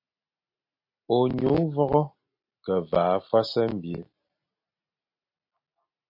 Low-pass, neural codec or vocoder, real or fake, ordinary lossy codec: 5.4 kHz; none; real; MP3, 32 kbps